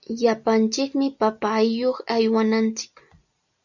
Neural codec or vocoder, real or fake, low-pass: none; real; 7.2 kHz